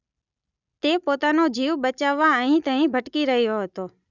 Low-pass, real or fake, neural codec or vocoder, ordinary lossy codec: 7.2 kHz; real; none; none